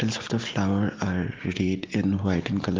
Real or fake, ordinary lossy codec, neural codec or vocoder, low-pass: real; Opus, 16 kbps; none; 7.2 kHz